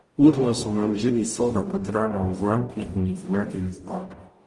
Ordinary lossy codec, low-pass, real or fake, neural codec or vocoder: Opus, 24 kbps; 10.8 kHz; fake; codec, 44.1 kHz, 0.9 kbps, DAC